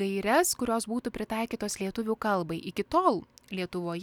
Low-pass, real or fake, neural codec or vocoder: 19.8 kHz; real; none